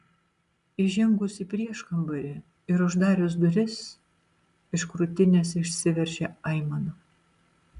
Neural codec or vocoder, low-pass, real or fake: none; 10.8 kHz; real